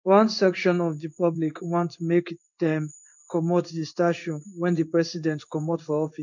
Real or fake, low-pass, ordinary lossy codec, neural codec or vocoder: fake; 7.2 kHz; none; codec, 16 kHz in and 24 kHz out, 1 kbps, XY-Tokenizer